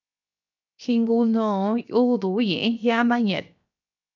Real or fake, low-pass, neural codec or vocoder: fake; 7.2 kHz; codec, 16 kHz, 0.7 kbps, FocalCodec